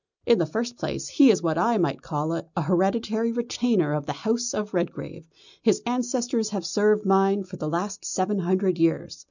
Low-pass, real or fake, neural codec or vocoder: 7.2 kHz; real; none